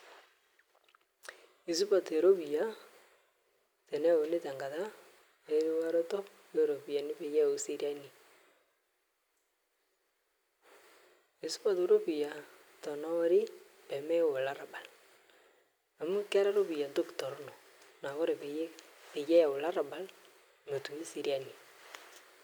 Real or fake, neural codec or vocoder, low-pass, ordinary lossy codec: real; none; none; none